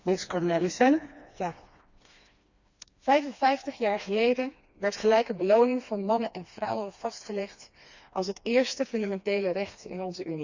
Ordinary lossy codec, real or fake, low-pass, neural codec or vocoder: Opus, 64 kbps; fake; 7.2 kHz; codec, 16 kHz, 2 kbps, FreqCodec, smaller model